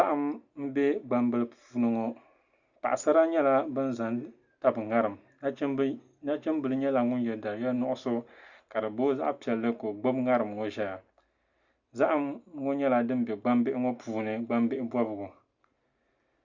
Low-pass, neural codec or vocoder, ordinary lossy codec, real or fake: 7.2 kHz; none; Opus, 64 kbps; real